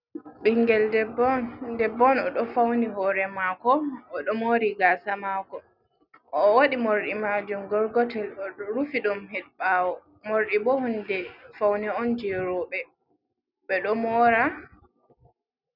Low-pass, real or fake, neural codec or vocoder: 5.4 kHz; real; none